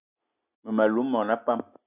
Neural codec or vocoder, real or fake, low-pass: none; real; 3.6 kHz